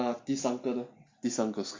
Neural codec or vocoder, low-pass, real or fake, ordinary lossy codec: none; 7.2 kHz; real; MP3, 48 kbps